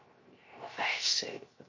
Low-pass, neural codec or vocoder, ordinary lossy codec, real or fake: 7.2 kHz; codec, 16 kHz, 0.3 kbps, FocalCodec; MP3, 32 kbps; fake